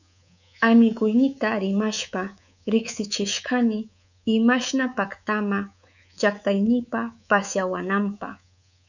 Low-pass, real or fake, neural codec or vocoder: 7.2 kHz; fake; codec, 24 kHz, 3.1 kbps, DualCodec